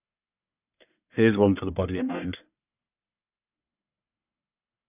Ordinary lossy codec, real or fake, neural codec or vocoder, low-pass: none; fake; codec, 44.1 kHz, 1.7 kbps, Pupu-Codec; 3.6 kHz